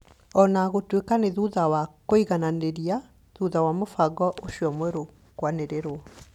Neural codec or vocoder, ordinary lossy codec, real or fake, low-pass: none; none; real; 19.8 kHz